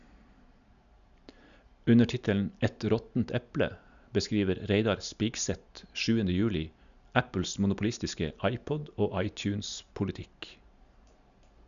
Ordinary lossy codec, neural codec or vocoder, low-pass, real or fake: none; none; 7.2 kHz; real